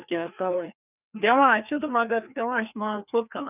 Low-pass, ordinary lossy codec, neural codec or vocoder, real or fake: 3.6 kHz; none; codec, 16 kHz, 2 kbps, FreqCodec, larger model; fake